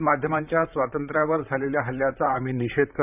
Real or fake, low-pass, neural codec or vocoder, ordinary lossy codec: fake; 3.6 kHz; vocoder, 44.1 kHz, 128 mel bands, Pupu-Vocoder; none